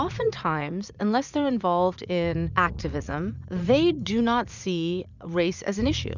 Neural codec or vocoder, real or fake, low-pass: vocoder, 44.1 kHz, 80 mel bands, Vocos; fake; 7.2 kHz